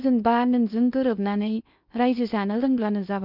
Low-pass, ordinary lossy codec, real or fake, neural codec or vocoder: 5.4 kHz; none; fake; codec, 16 kHz in and 24 kHz out, 0.6 kbps, FocalCodec, streaming, 2048 codes